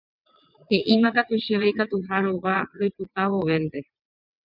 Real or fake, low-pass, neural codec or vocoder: fake; 5.4 kHz; vocoder, 22.05 kHz, 80 mel bands, WaveNeXt